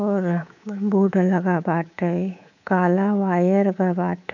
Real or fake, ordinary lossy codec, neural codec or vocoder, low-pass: fake; none; codec, 24 kHz, 3.1 kbps, DualCodec; 7.2 kHz